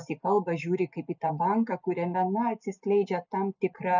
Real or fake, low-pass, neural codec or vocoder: real; 7.2 kHz; none